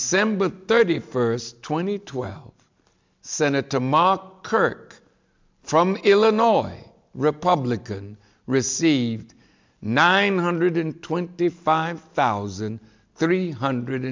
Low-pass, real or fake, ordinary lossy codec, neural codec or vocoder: 7.2 kHz; real; MP3, 64 kbps; none